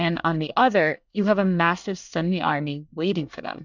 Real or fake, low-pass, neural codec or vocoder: fake; 7.2 kHz; codec, 24 kHz, 1 kbps, SNAC